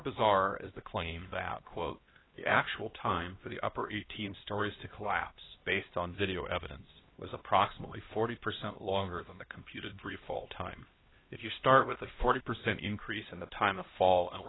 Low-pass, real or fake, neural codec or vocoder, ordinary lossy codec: 7.2 kHz; fake; codec, 16 kHz, 1 kbps, X-Codec, HuBERT features, trained on LibriSpeech; AAC, 16 kbps